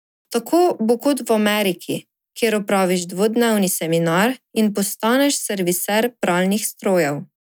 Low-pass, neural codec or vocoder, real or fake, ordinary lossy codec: none; none; real; none